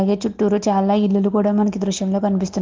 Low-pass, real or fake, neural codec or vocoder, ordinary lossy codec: 7.2 kHz; real; none; Opus, 16 kbps